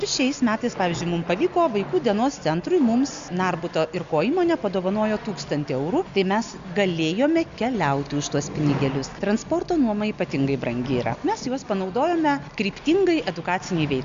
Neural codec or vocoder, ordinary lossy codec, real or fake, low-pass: none; Opus, 64 kbps; real; 7.2 kHz